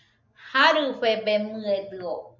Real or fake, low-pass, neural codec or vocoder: real; 7.2 kHz; none